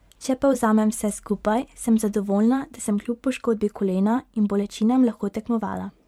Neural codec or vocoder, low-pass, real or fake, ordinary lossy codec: vocoder, 44.1 kHz, 128 mel bands every 512 samples, BigVGAN v2; 19.8 kHz; fake; MP3, 96 kbps